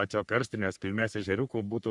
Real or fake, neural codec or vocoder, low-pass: fake; codec, 44.1 kHz, 3.4 kbps, Pupu-Codec; 10.8 kHz